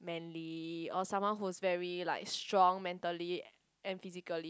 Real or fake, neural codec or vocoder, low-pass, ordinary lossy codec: real; none; none; none